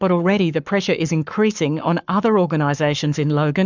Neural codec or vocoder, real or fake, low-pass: codec, 44.1 kHz, 7.8 kbps, Pupu-Codec; fake; 7.2 kHz